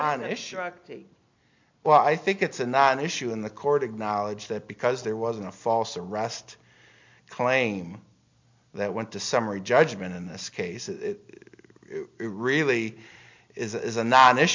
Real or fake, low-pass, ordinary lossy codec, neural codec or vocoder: real; 7.2 kHz; MP3, 64 kbps; none